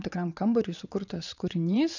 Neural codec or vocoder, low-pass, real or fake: vocoder, 22.05 kHz, 80 mel bands, WaveNeXt; 7.2 kHz; fake